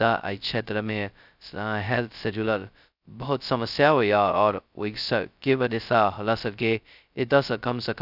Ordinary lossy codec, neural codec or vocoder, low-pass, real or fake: none; codec, 16 kHz, 0.2 kbps, FocalCodec; 5.4 kHz; fake